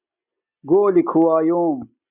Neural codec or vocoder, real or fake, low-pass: none; real; 3.6 kHz